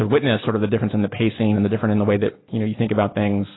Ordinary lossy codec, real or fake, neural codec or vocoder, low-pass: AAC, 16 kbps; real; none; 7.2 kHz